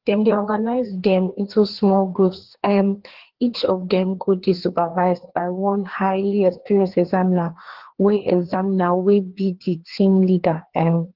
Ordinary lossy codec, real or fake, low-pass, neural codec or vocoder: Opus, 16 kbps; fake; 5.4 kHz; codec, 16 kHz, 2 kbps, FreqCodec, larger model